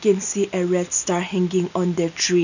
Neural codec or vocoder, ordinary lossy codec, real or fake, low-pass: none; none; real; 7.2 kHz